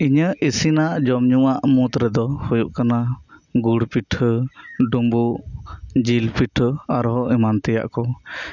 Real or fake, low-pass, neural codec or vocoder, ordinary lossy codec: real; 7.2 kHz; none; none